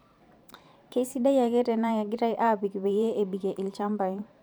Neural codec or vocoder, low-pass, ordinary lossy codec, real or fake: vocoder, 44.1 kHz, 128 mel bands every 512 samples, BigVGAN v2; none; none; fake